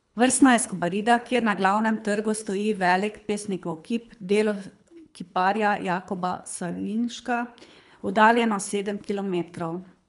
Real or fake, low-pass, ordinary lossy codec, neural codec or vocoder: fake; 10.8 kHz; none; codec, 24 kHz, 3 kbps, HILCodec